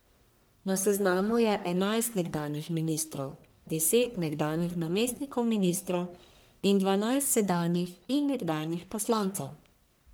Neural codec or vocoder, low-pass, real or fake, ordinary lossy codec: codec, 44.1 kHz, 1.7 kbps, Pupu-Codec; none; fake; none